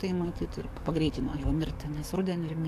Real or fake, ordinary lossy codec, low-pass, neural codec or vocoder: fake; AAC, 64 kbps; 14.4 kHz; codec, 44.1 kHz, 7.8 kbps, DAC